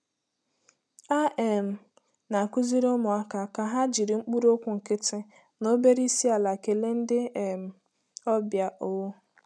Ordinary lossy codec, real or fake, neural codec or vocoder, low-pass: none; real; none; none